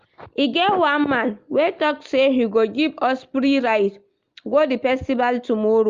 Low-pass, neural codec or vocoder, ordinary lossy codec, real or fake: 7.2 kHz; none; Opus, 32 kbps; real